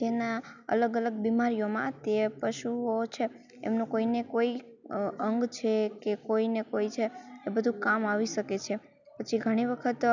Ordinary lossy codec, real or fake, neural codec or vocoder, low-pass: none; real; none; 7.2 kHz